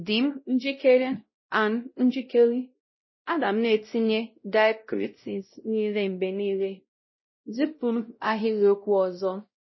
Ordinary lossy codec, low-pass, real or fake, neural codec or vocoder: MP3, 24 kbps; 7.2 kHz; fake; codec, 16 kHz, 0.5 kbps, X-Codec, WavLM features, trained on Multilingual LibriSpeech